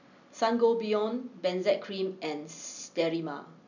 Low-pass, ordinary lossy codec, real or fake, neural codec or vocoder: 7.2 kHz; none; real; none